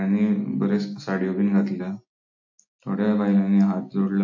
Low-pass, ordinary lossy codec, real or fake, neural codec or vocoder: 7.2 kHz; AAC, 48 kbps; real; none